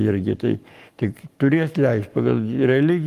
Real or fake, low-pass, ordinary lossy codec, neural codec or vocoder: fake; 14.4 kHz; Opus, 32 kbps; codec, 44.1 kHz, 7.8 kbps, Pupu-Codec